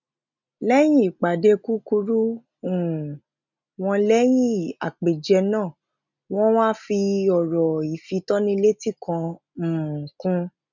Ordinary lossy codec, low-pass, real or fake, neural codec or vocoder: none; 7.2 kHz; real; none